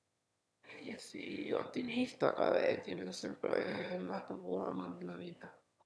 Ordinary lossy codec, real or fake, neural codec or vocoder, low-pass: none; fake; autoencoder, 22.05 kHz, a latent of 192 numbers a frame, VITS, trained on one speaker; none